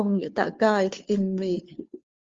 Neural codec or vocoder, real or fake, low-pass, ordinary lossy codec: codec, 16 kHz, 2 kbps, FunCodec, trained on Chinese and English, 25 frames a second; fake; 7.2 kHz; Opus, 32 kbps